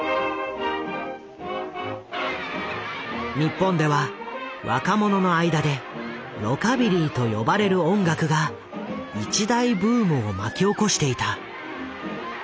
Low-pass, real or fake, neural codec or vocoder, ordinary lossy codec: none; real; none; none